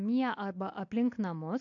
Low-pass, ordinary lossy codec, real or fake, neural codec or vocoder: 7.2 kHz; AAC, 48 kbps; real; none